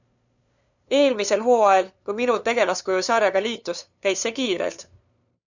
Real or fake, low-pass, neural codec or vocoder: fake; 7.2 kHz; codec, 16 kHz, 2 kbps, FunCodec, trained on LibriTTS, 25 frames a second